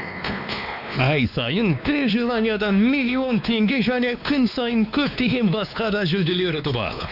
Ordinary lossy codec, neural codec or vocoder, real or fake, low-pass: none; codec, 16 kHz, 2 kbps, X-Codec, WavLM features, trained on Multilingual LibriSpeech; fake; 5.4 kHz